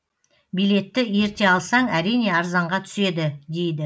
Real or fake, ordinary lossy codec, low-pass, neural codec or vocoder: real; none; none; none